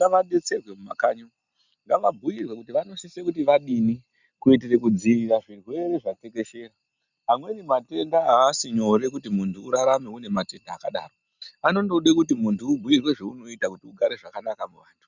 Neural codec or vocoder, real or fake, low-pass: none; real; 7.2 kHz